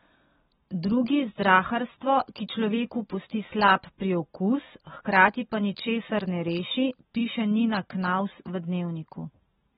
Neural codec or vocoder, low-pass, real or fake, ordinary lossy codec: none; 7.2 kHz; real; AAC, 16 kbps